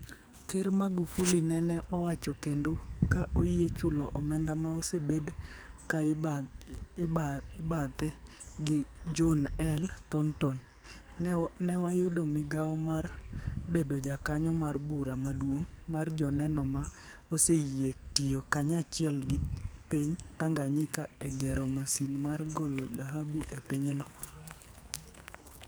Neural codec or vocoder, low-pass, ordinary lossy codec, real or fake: codec, 44.1 kHz, 2.6 kbps, SNAC; none; none; fake